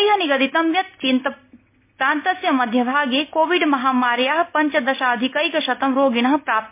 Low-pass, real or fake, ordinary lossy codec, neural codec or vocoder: 3.6 kHz; real; MP3, 24 kbps; none